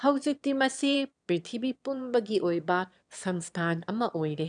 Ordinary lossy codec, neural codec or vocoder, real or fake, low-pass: none; autoencoder, 22.05 kHz, a latent of 192 numbers a frame, VITS, trained on one speaker; fake; 9.9 kHz